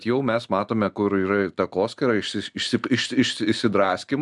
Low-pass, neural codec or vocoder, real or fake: 10.8 kHz; none; real